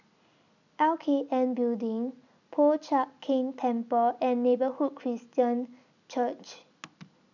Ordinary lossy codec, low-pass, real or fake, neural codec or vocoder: none; 7.2 kHz; real; none